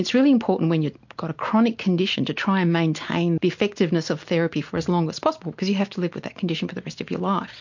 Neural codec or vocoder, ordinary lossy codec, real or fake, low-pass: none; MP3, 48 kbps; real; 7.2 kHz